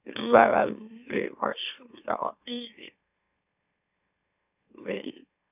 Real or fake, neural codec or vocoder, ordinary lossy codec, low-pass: fake; autoencoder, 44.1 kHz, a latent of 192 numbers a frame, MeloTTS; none; 3.6 kHz